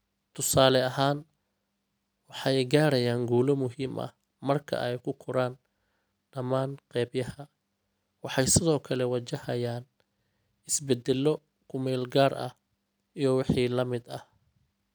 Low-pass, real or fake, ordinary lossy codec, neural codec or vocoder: none; real; none; none